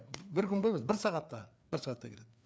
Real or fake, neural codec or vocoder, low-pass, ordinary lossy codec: fake; codec, 16 kHz, 4 kbps, FreqCodec, larger model; none; none